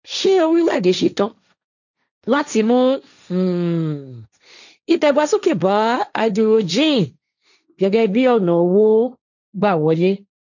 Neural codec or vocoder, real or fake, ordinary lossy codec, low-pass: codec, 16 kHz, 1.1 kbps, Voila-Tokenizer; fake; none; 7.2 kHz